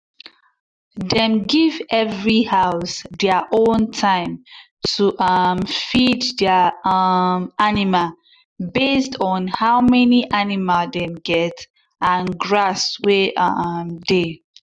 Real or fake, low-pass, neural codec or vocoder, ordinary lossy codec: real; 9.9 kHz; none; none